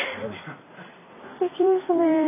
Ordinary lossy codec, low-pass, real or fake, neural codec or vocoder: none; 3.6 kHz; fake; vocoder, 44.1 kHz, 128 mel bands every 256 samples, BigVGAN v2